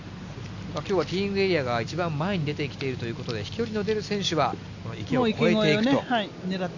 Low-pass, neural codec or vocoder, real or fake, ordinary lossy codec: 7.2 kHz; none; real; none